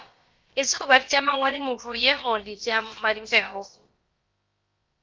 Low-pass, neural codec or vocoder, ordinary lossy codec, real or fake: 7.2 kHz; codec, 16 kHz, about 1 kbps, DyCAST, with the encoder's durations; Opus, 32 kbps; fake